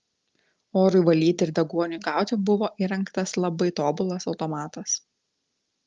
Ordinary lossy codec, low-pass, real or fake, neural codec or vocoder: Opus, 32 kbps; 7.2 kHz; real; none